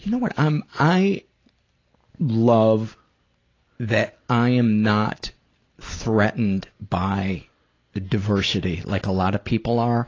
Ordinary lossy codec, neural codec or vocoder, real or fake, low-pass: AAC, 32 kbps; none; real; 7.2 kHz